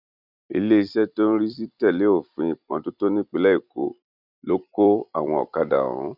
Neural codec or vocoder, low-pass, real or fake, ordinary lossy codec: vocoder, 44.1 kHz, 128 mel bands every 256 samples, BigVGAN v2; 5.4 kHz; fake; none